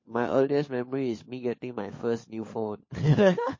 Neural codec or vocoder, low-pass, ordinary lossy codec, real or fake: codec, 16 kHz, 4 kbps, FunCodec, trained on LibriTTS, 50 frames a second; 7.2 kHz; MP3, 32 kbps; fake